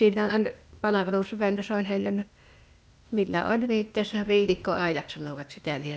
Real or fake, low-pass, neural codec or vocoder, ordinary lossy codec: fake; none; codec, 16 kHz, 0.8 kbps, ZipCodec; none